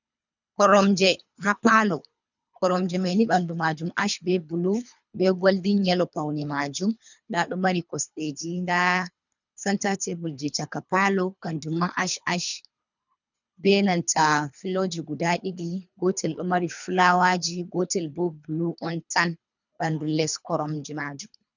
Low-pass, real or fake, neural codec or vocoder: 7.2 kHz; fake; codec, 24 kHz, 3 kbps, HILCodec